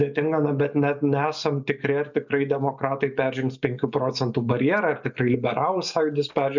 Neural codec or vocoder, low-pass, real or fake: none; 7.2 kHz; real